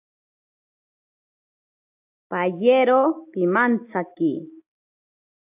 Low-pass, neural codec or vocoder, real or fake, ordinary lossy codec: 3.6 kHz; none; real; AAC, 32 kbps